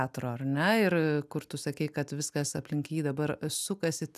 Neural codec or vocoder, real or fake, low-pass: none; real; 14.4 kHz